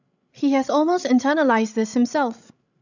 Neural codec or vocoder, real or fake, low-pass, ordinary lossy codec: codec, 16 kHz, 16 kbps, FreqCodec, larger model; fake; 7.2 kHz; none